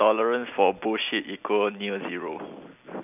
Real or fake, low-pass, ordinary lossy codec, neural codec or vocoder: real; 3.6 kHz; none; none